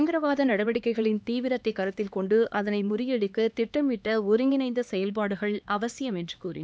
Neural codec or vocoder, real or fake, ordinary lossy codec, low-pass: codec, 16 kHz, 2 kbps, X-Codec, HuBERT features, trained on LibriSpeech; fake; none; none